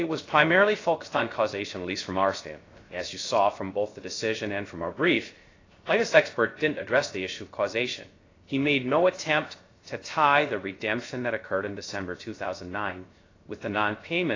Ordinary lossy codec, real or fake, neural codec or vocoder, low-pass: AAC, 32 kbps; fake; codec, 16 kHz, 0.3 kbps, FocalCodec; 7.2 kHz